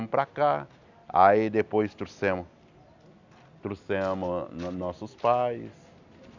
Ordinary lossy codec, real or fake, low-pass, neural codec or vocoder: none; real; 7.2 kHz; none